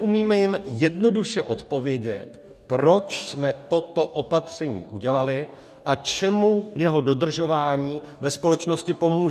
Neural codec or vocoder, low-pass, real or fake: codec, 44.1 kHz, 2.6 kbps, DAC; 14.4 kHz; fake